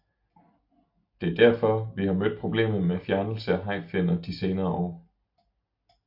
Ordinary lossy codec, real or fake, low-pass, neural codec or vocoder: AAC, 48 kbps; real; 5.4 kHz; none